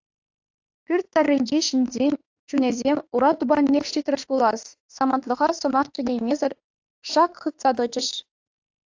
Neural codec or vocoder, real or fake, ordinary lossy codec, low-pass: autoencoder, 48 kHz, 32 numbers a frame, DAC-VAE, trained on Japanese speech; fake; AAC, 48 kbps; 7.2 kHz